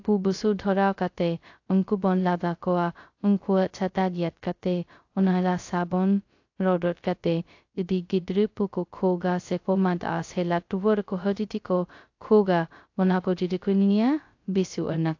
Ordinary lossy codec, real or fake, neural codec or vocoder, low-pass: AAC, 48 kbps; fake; codec, 16 kHz, 0.2 kbps, FocalCodec; 7.2 kHz